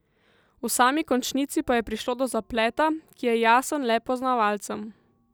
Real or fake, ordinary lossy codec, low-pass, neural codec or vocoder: real; none; none; none